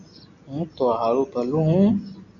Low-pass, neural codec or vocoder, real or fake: 7.2 kHz; none; real